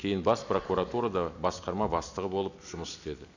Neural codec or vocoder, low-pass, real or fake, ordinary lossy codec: none; 7.2 kHz; real; none